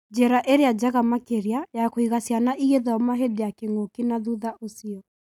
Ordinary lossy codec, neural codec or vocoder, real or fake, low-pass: none; none; real; 19.8 kHz